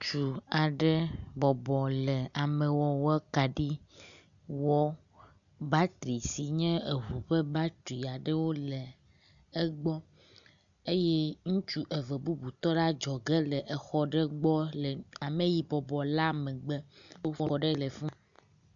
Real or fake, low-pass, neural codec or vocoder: real; 7.2 kHz; none